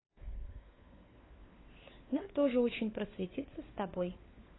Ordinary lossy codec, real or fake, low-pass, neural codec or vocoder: AAC, 16 kbps; fake; 7.2 kHz; codec, 16 kHz, 4 kbps, FunCodec, trained on LibriTTS, 50 frames a second